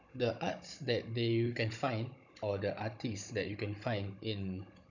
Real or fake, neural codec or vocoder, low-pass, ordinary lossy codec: fake; codec, 16 kHz, 16 kbps, FunCodec, trained on Chinese and English, 50 frames a second; 7.2 kHz; none